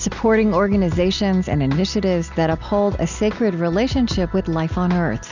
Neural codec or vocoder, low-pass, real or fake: none; 7.2 kHz; real